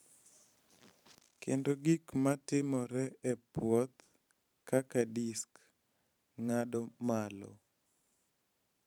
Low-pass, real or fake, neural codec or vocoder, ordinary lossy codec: 19.8 kHz; fake; vocoder, 44.1 kHz, 128 mel bands every 512 samples, BigVGAN v2; none